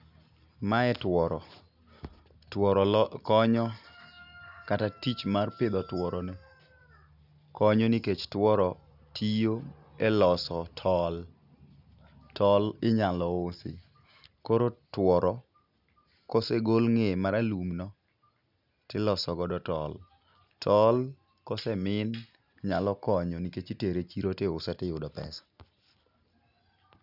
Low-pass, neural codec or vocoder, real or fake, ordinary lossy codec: 5.4 kHz; none; real; none